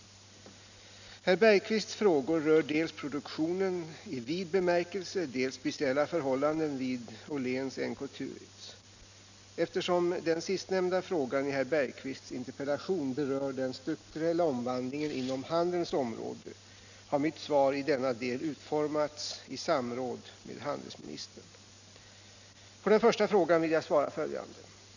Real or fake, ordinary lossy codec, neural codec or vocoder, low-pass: real; none; none; 7.2 kHz